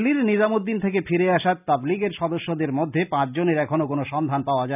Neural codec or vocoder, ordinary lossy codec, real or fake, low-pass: none; none; real; 3.6 kHz